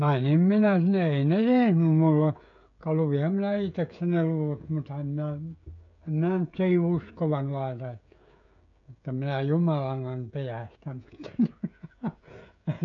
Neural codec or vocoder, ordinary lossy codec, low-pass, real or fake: codec, 16 kHz, 16 kbps, FreqCodec, smaller model; none; 7.2 kHz; fake